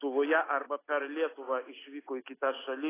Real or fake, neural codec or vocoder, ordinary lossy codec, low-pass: real; none; AAC, 16 kbps; 3.6 kHz